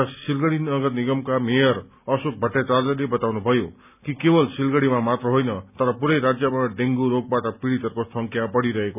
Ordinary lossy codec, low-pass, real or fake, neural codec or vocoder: none; 3.6 kHz; real; none